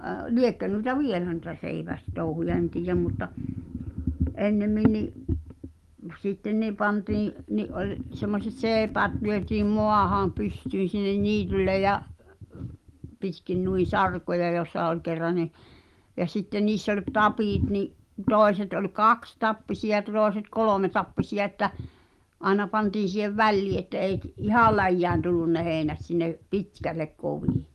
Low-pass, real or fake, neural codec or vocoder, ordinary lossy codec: 14.4 kHz; real; none; Opus, 24 kbps